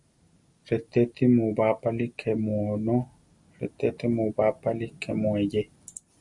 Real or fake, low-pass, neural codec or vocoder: real; 10.8 kHz; none